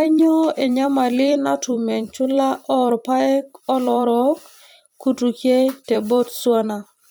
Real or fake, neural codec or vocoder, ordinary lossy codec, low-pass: fake; vocoder, 44.1 kHz, 128 mel bands every 256 samples, BigVGAN v2; none; none